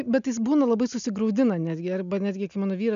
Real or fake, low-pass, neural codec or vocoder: real; 7.2 kHz; none